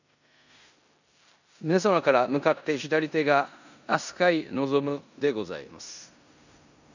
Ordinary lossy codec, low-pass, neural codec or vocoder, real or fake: none; 7.2 kHz; codec, 16 kHz in and 24 kHz out, 0.9 kbps, LongCat-Audio-Codec, four codebook decoder; fake